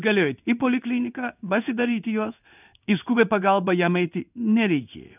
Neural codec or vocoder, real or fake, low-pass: codec, 16 kHz in and 24 kHz out, 1 kbps, XY-Tokenizer; fake; 3.6 kHz